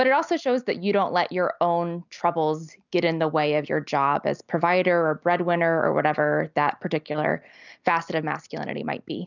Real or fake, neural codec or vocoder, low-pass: real; none; 7.2 kHz